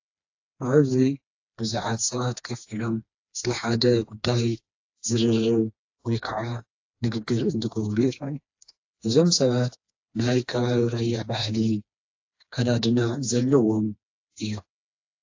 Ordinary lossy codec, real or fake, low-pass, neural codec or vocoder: AAC, 48 kbps; fake; 7.2 kHz; codec, 16 kHz, 2 kbps, FreqCodec, smaller model